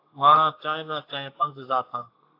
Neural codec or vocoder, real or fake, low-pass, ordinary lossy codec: codec, 32 kHz, 1.9 kbps, SNAC; fake; 5.4 kHz; AAC, 32 kbps